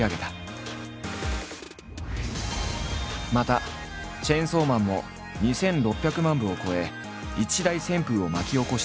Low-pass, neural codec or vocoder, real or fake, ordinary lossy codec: none; none; real; none